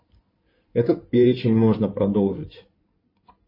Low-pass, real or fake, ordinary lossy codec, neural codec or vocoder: 5.4 kHz; fake; MP3, 24 kbps; codec, 16 kHz in and 24 kHz out, 2.2 kbps, FireRedTTS-2 codec